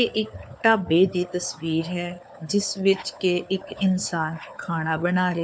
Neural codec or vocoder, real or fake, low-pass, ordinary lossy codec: codec, 16 kHz, 4 kbps, FunCodec, trained on LibriTTS, 50 frames a second; fake; none; none